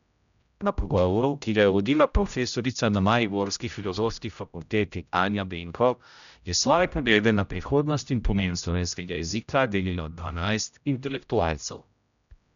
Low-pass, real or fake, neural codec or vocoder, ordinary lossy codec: 7.2 kHz; fake; codec, 16 kHz, 0.5 kbps, X-Codec, HuBERT features, trained on general audio; none